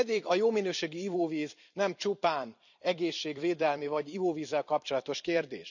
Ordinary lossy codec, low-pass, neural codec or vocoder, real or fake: none; 7.2 kHz; vocoder, 44.1 kHz, 128 mel bands every 256 samples, BigVGAN v2; fake